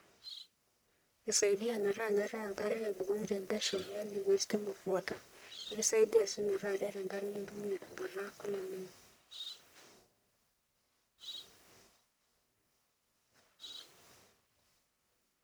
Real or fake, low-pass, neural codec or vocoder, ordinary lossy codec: fake; none; codec, 44.1 kHz, 1.7 kbps, Pupu-Codec; none